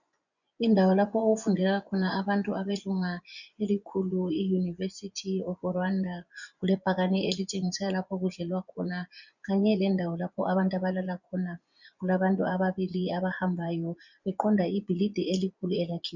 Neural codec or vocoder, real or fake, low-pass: vocoder, 24 kHz, 100 mel bands, Vocos; fake; 7.2 kHz